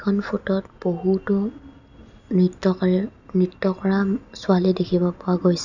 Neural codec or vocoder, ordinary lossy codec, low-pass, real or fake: none; none; 7.2 kHz; real